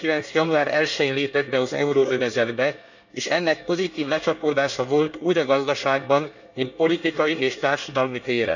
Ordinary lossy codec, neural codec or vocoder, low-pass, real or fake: none; codec, 24 kHz, 1 kbps, SNAC; 7.2 kHz; fake